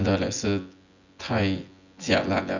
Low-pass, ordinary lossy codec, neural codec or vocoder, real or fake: 7.2 kHz; none; vocoder, 24 kHz, 100 mel bands, Vocos; fake